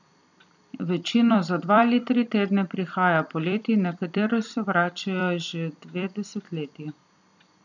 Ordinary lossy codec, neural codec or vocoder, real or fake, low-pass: none; vocoder, 44.1 kHz, 128 mel bands every 256 samples, BigVGAN v2; fake; 7.2 kHz